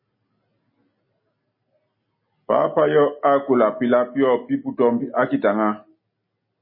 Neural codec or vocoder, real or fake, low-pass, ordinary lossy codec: none; real; 5.4 kHz; MP3, 24 kbps